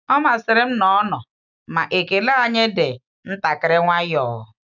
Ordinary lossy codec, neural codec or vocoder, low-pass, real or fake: none; none; 7.2 kHz; real